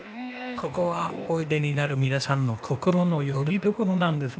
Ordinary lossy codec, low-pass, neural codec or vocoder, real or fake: none; none; codec, 16 kHz, 0.8 kbps, ZipCodec; fake